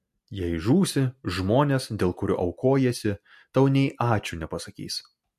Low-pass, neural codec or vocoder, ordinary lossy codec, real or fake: 14.4 kHz; none; MP3, 64 kbps; real